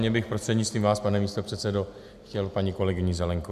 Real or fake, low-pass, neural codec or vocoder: real; 14.4 kHz; none